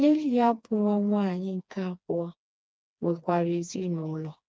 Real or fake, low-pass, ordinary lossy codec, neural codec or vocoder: fake; none; none; codec, 16 kHz, 2 kbps, FreqCodec, smaller model